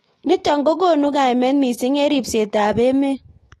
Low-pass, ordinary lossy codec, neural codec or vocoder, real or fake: 19.8 kHz; AAC, 32 kbps; autoencoder, 48 kHz, 128 numbers a frame, DAC-VAE, trained on Japanese speech; fake